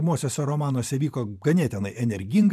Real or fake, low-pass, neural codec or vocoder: fake; 14.4 kHz; vocoder, 44.1 kHz, 128 mel bands every 512 samples, BigVGAN v2